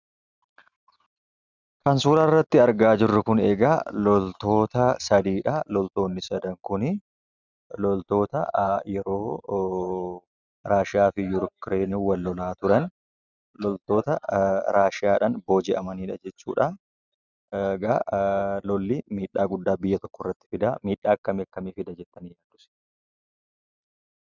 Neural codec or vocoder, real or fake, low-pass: none; real; 7.2 kHz